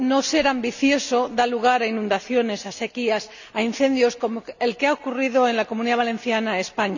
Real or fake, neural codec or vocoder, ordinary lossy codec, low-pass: real; none; none; 7.2 kHz